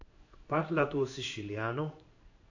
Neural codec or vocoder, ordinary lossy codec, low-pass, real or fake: codec, 16 kHz in and 24 kHz out, 1 kbps, XY-Tokenizer; AAC, 48 kbps; 7.2 kHz; fake